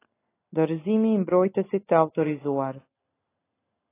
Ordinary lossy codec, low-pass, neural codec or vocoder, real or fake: AAC, 16 kbps; 3.6 kHz; none; real